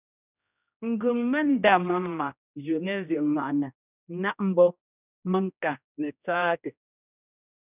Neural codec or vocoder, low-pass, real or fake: codec, 16 kHz, 1 kbps, X-Codec, HuBERT features, trained on general audio; 3.6 kHz; fake